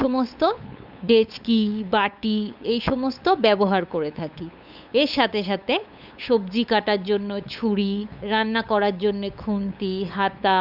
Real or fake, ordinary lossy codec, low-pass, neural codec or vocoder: fake; none; 5.4 kHz; codec, 16 kHz, 8 kbps, FunCodec, trained on LibriTTS, 25 frames a second